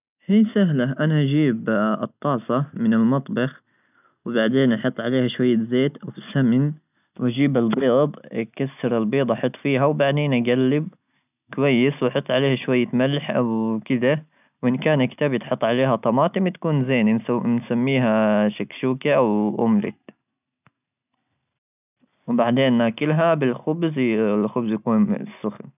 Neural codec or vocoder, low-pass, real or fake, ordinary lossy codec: none; 3.6 kHz; real; none